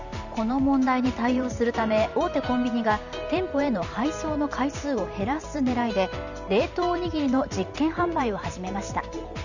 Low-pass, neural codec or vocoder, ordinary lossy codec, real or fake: 7.2 kHz; vocoder, 44.1 kHz, 128 mel bands every 512 samples, BigVGAN v2; none; fake